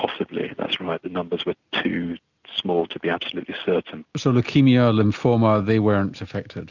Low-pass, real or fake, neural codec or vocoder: 7.2 kHz; real; none